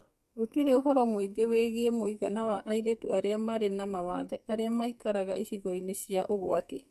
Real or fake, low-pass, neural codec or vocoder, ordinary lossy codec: fake; 14.4 kHz; codec, 44.1 kHz, 2.6 kbps, SNAC; MP3, 96 kbps